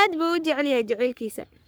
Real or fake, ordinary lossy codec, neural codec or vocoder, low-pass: fake; none; codec, 44.1 kHz, 3.4 kbps, Pupu-Codec; none